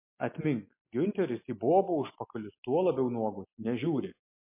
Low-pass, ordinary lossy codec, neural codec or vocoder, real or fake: 3.6 kHz; MP3, 24 kbps; none; real